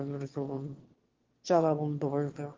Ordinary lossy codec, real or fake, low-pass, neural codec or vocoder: Opus, 16 kbps; fake; 7.2 kHz; autoencoder, 22.05 kHz, a latent of 192 numbers a frame, VITS, trained on one speaker